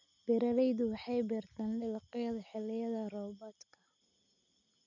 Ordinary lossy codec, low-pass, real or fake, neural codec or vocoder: none; 7.2 kHz; real; none